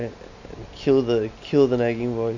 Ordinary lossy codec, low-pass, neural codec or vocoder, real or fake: none; 7.2 kHz; none; real